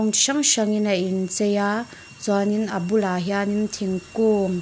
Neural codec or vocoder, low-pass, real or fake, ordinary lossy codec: none; none; real; none